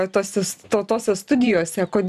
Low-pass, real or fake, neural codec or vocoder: 14.4 kHz; fake; vocoder, 44.1 kHz, 128 mel bands every 512 samples, BigVGAN v2